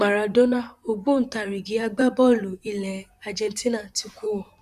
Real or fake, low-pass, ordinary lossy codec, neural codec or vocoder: fake; 14.4 kHz; none; vocoder, 44.1 kHz, 128 mel bands, Pupu-Vocoder